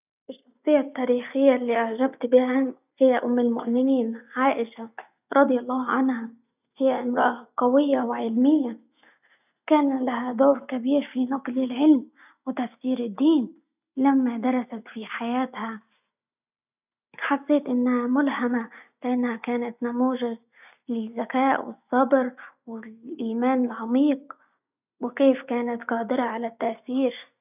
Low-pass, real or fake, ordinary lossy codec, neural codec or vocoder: 3.6 kHz; real; none; none